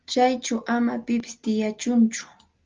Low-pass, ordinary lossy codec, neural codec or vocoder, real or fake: 7.2 kHz; Opus, 32 kbps; none; real